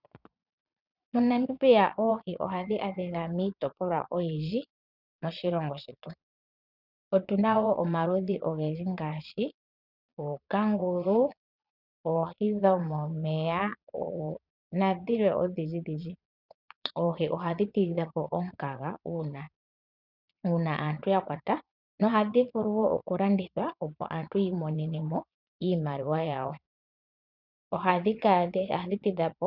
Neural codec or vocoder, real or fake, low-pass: vocoder, 22.05 kHz, 80 mel bands, Vocos; fake; 5.4 kHz